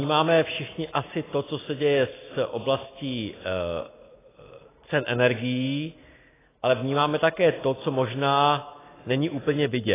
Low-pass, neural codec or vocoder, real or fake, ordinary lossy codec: 3.6 kHz; none; real; AAC, 16 kbps